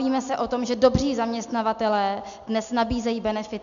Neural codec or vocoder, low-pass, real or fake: none; 7.2 kHz; real